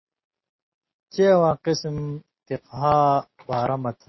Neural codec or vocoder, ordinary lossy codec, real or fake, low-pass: none; MP3, 24 kbps; real; 7.2 kHz